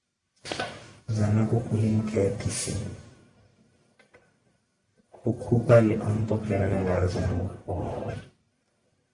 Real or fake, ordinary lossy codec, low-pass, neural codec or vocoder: fake; Opus, 64 kbps; 10.8 kHz; codec, 44.1 kHz, 1.7 kbps, Pupu-Codec